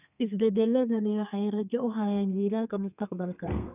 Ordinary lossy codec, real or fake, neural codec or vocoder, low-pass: none; fake; codec, 32 kHz, 1.9 kbps, SNAC; 3.6 kHz